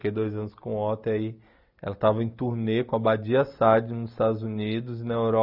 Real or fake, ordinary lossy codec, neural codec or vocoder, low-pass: real; none; none; 5.4 kHz